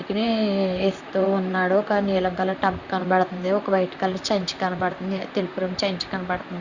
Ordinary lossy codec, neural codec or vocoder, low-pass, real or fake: Opus, 64 kbps; vocoder, 44.1 kHz, 128 mel bands, Pupu-Vocoder; 7.2 kHz; fake